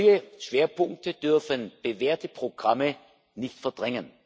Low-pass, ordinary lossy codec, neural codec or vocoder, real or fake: none; none; none; real